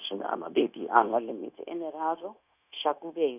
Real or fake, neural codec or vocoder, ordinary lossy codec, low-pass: fake; codec, 16 kHz, 0.9 kbps, LongCat-Audio-Codec; none; 3.6 kHz